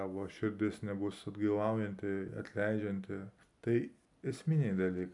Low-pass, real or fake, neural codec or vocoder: 10.8 kHz; real; none